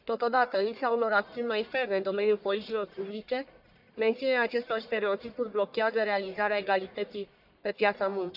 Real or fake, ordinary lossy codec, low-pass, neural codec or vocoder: fake; none; 5.4 kHz; codec, 44.1 kHz, 1.7 kbps, Pupu-Codec